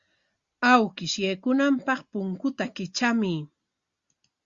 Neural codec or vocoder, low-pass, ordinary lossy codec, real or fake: none; 7.2 kHz; Opus, 64 kbps; real